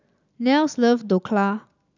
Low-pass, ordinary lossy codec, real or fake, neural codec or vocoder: 7.2 kHz; none; real; none